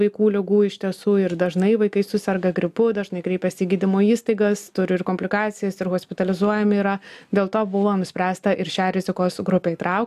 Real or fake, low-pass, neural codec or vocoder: real; 14.4 kHz; none